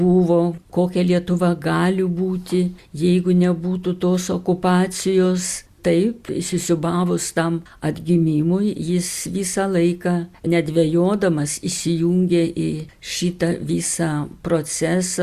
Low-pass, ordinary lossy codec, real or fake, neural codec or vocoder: 14.4 kHz; Opus, 64 kbps; real; none